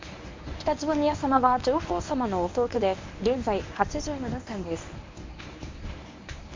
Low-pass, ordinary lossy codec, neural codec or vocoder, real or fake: 7.2 kHz; MP3, 48 kbps; codec, 24 kHz, 0.9 kbps, WavTokenizer, medium speech release version 1; fake